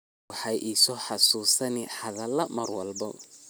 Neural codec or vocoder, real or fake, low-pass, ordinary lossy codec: none; real; none; none